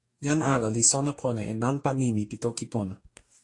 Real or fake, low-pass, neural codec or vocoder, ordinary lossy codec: fake; 10.8 kHz; codec, 44.1 kHz, 2.6 kbps, DAC; AAC, 48 kbps